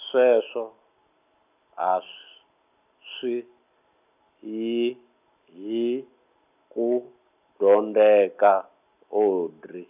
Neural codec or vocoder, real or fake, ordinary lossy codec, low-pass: none; real; none; 3.6 kHz